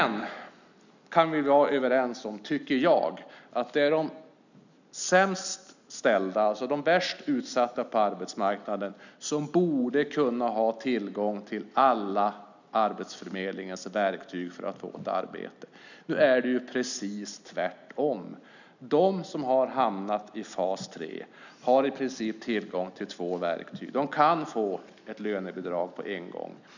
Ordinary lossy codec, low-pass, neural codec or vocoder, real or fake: none; 7.2 kHz; none; real